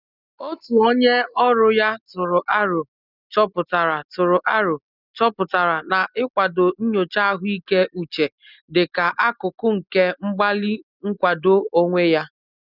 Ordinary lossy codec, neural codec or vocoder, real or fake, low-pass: none; none; real; 5.4 kHz